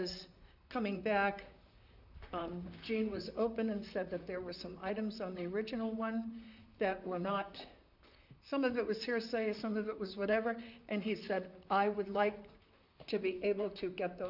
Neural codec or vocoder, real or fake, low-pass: vocoder, 44.1 kHz, 128 mel bands, Pupu-Vocoder; fake; 5.4 kHz